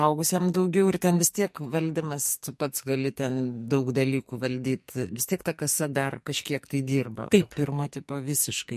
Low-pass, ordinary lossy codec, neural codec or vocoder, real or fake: 14.4 kHz; MP3, 64 kbps; codec, 44.1 kHz, 2.6 kbps, SNAC; fake